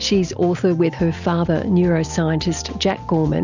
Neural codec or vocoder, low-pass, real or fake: none; 7.2 kHz; real